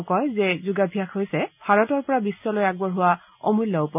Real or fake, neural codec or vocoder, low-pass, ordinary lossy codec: real; none; 3.6 kHz; none